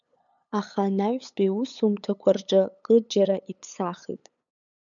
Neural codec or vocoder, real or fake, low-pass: codec, 16 kHz, 8 kbps, FunCodec, trained on LibriTTS, 25 frames a second; fake; 7.2 kHz